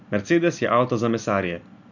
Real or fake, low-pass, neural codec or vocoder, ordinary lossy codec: real; 7.2 kHz; none; none